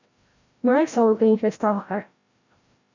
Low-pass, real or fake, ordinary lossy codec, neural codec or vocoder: 7.2 kHz; fake; Opus, 64 kbps; codec, 16 kHz, 0.5 kbps, FreqCodec, larger model